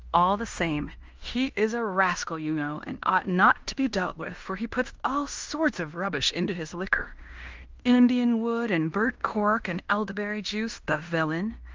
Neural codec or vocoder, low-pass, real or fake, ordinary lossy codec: codec, 16 kHz in and 24 kHz out, 0.9 kbps, LongCat-Audio-Codec, fine tuned four codebook decoder; 7.2 kHz; fake; Opus, 24 kbps